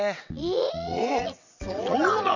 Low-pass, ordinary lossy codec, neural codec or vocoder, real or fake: 7.2 kHz; none; vocoder, 22.05 kHz, 80 mel bands, WaveNeXt; fake